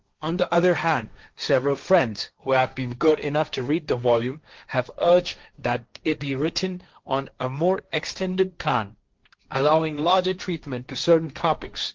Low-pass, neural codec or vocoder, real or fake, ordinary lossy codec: 7.2 kHz; codec, 16 kHz, 1.1 kbps, Voila-Tokenizer; fake; Opus, 32 kbps